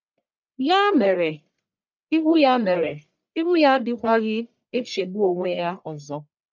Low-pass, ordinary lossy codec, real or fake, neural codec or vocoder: 7.2 kHz; none; fake; codec, 44.1 kHz, 1.7 kbps, Pupu-Codec